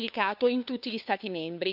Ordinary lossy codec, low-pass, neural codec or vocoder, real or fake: none; 5.4 kHz; codec, 16 kHz, 2 kbps, FunCodec, trained on LibriTTS, 25 frames a second; fake